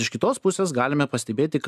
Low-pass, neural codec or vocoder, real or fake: 14.4 kHz; none; real